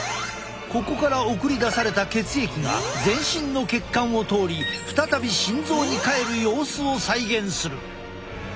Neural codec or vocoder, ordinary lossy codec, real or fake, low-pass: none; none; real; none